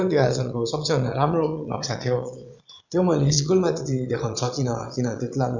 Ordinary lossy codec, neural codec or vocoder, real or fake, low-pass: none; vocoder, 22.05 kHz, 80 mel bands, Vocos; fake; 7.2 kHz